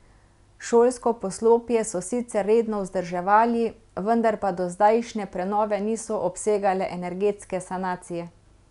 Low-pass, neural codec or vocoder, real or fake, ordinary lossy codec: 10.8 kHz; none; real; none